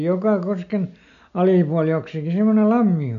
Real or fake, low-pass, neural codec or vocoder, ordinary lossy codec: real; 7.2 kHz; none; none